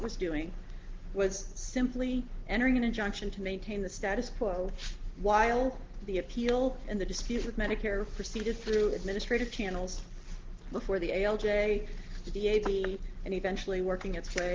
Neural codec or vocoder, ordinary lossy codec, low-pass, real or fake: none; Opus, 16 kbps; 7.2 kHz; real